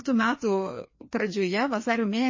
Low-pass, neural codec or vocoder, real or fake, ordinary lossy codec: 7.2 kHz; codec, 16 kHz, 2 kbps, FreqCodec, larger model; fake; MP3, 32 kbps